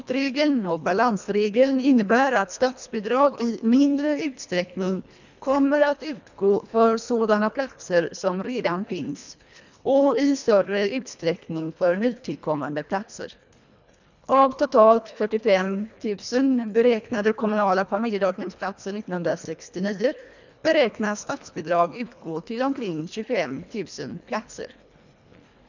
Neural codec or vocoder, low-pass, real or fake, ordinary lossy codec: codec, 24 kHz, 1.5 kbps, HILCodec; 7.2 kHz; fake; none